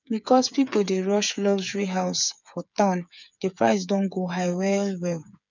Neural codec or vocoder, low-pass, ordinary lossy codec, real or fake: codec, 16 kHz, 8 kbps, FreqCodec, smaller model; 7.2 kHz; none; fake